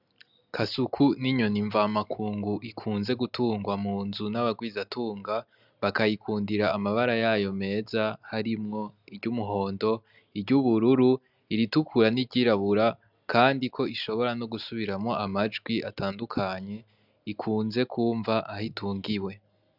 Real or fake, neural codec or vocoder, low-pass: real; none; 5.4 kHz